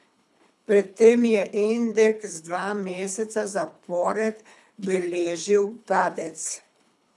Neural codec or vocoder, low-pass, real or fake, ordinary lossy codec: codec, 24 kHz, 3 kbps, HILCodec; none; fake; none